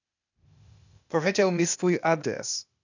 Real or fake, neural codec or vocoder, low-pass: fake; codec, 16 kHz, 0.8 kbps, ZipCodec; 7.2 kHz